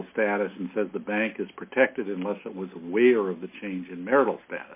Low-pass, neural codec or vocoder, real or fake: 3.6 kHz; none; real